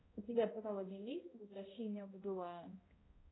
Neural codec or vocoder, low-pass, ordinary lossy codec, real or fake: codec, 16 kHz, 0.5 kbps, X-Codec, HuBERT features, trained on balanced general audio; 7.2 kHz; AAC, 16 kbps; fake